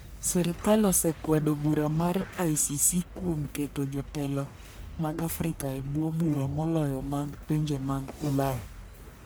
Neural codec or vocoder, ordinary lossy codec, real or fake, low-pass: codec, 44.1 kHz, 1.7 kbps, Pupu-Codec; none; fake; none